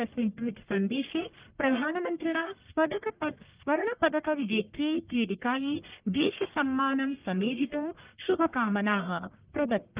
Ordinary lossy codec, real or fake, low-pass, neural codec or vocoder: Opus, 32 kbps; fake; 3.6 kHz; codec, 44.1 kHz, 1.7 kbps, Pupu-Codec